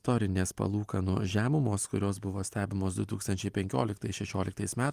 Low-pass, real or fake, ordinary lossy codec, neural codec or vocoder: 14.4 kHz; real; Opus, 32 kbps; none